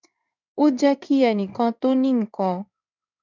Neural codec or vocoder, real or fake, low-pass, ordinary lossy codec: codec, 16 kHz in and 24 kHz out, 1 kbps, XY-Tokenizer; fake; 7.2 kHz; none